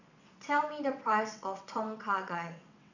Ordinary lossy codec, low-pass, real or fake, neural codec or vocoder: none; 7.2 kHz; fake; vocoder, 22.05 kHz, 80 mel bands, WaveNeXt